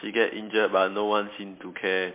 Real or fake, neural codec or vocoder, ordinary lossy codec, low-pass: real; none; MP3, 24 kbps; 3.6 kHz